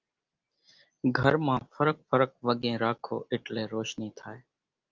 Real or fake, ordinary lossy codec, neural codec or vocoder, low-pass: real; Opus, 24 kbps; none; 7.2 kHz